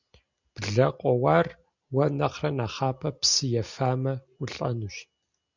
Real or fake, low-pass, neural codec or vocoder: real; 7.2 kHz; none